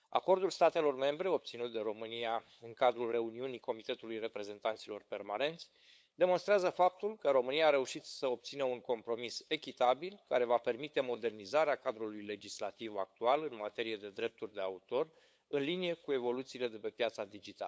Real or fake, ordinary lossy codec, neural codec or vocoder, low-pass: fake; none; codec, 16 kHz, 8 kbps, FunCodec, trained on LibriTTS, 25 frames a second; none